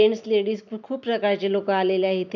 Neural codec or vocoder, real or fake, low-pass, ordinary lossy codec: none; real; 7.2 kHz; none